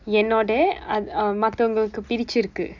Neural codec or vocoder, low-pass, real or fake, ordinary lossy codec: none; 7.2 kHz; real; none